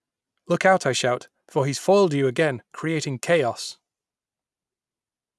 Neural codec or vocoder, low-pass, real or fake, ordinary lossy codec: none; none; real; none